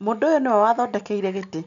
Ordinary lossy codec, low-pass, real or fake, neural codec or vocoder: none; 7.2 kHz; real; none